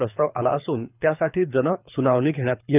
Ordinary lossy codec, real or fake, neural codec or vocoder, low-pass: none; fake; codec, 24 kHz, 6 kbps, HILCodec; 3.6 kHz